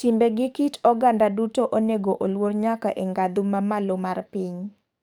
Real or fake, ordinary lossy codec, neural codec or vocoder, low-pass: fake; none; codec, 44.1 kHz, 7.8 kbps, DAC; 19.8 kHz